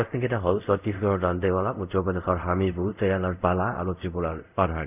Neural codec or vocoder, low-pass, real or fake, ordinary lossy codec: codec, 24 kHz, 0.5 kbps, DualCodec; 3.6 kHz; fake; none